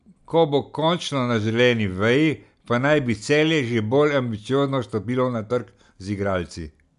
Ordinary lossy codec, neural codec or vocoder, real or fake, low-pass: none; none; real; 10.8 kHz